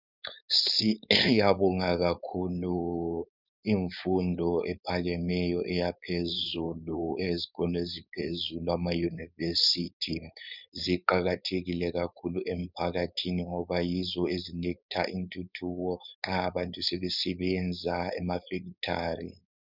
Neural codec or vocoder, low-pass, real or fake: codec, 16 kHz, 4.8 kbps, FACodec; 5.4 kHz; fake